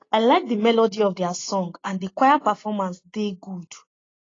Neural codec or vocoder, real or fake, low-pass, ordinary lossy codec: none; real; 7.2 kHz; AAC, 32 kbps